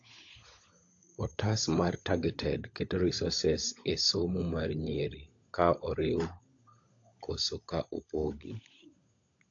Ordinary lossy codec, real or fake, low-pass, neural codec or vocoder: AAC, 48 kbps; fake; 7.2 kHz; codec, 16 kHz, 16 kbps, FunCodec, trained on LibriTTS, 50 frames a second